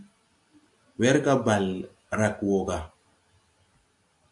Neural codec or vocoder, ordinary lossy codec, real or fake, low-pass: none; AAC, 64 kbps; real; 10.8 kHz